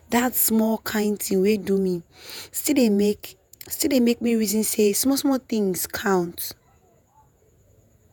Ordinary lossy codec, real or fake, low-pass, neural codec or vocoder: none; fake; none; vocoder, 48 kHz, 128 mel bands, Vocos